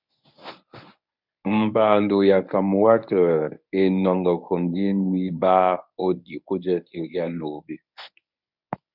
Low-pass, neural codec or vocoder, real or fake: 5.4 kHz; codec, 24 kHz, 0.9 kbps, WavTokenizer, medium speech release version 1; fake